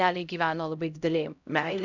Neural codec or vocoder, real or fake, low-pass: codec, 16 kHz, 0.5 kbps, X-Codec, HuBERT features, trained on LibriSpeech; fake; 7.2 kHz